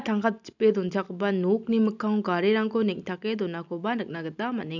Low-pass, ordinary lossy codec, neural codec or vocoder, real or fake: 7.2 kHz; none; none; real